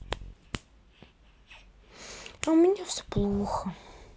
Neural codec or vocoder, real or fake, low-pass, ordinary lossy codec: none; real; none; none